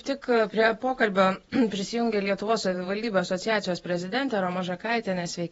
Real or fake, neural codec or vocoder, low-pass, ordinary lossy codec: fake; vocoder, 48 kHz, 128 mel bands, Vocos; 19.8 kHz; AAC, 24 kbps